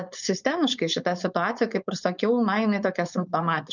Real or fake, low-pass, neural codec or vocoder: fake; 7.2 kHz; codec, 16 kHz, 4.8 kbps, FACodec